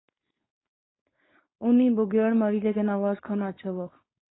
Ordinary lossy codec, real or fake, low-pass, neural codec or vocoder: AAC, 16 kbps; fake; 7.2 kHz; codec, 16 kHz, 4.8 kbps, FACodec